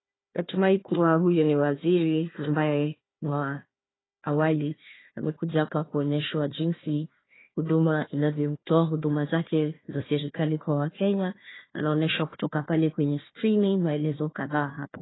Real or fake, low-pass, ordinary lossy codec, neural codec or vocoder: fake; 7.2 kHz; AAC, 16 kbps; codec, 16 kHz, 1 kbps, FunCodec, trained on Chinese and English, 50 frames a second